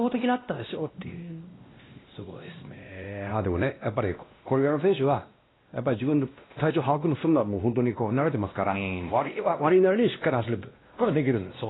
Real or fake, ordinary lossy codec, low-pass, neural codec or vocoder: fake; AAC, 16 kbps; 7.2 kHz; codec, 16 kHz, 1 kbps, X-Codec, WavLM features, trained on Multilingual LibriSpeech